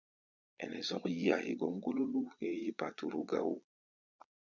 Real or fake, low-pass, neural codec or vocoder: fake; 7.2 kHz; vocoder, 22.05 kHz, 80 mel bands, Vocos